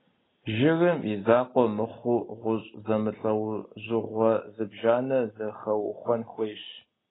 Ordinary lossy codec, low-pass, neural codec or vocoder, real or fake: AAC, 16 kbps; 7.2 kHz; none; real